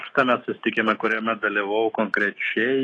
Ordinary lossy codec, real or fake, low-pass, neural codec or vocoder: AAC, 32 kbps; fake; 10.8 kHz; autoencoder, 48 kHz, 128 numbers a frame, DAC-VAE, trained on Japanese speech